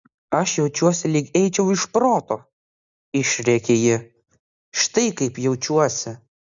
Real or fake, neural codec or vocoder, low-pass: real; none; 7.2 kHz